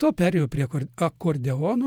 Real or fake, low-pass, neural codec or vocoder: fake; 19.8 kHz; vocoder, 48 kHz, 128 mel bands, Vocos